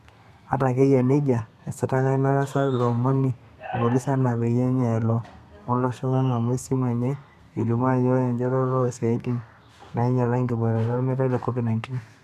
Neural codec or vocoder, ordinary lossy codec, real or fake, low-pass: codec, 32 kHz, 1.9 kbps, SNAC; none; fake; 14.4 kHz